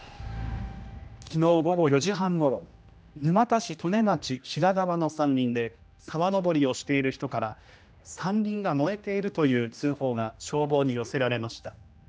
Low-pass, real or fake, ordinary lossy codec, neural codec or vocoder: none; fake; none; codec, 16 kHz, 1 kbps, X-Codec, HuBERT features, trained on general audio